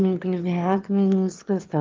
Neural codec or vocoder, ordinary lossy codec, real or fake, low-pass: autoencoder, 22.05 kHz, a latent of 192 numbers a frame, VITS, trained on one speaker; Opus, 32 kbps; fake; 7.2 kHz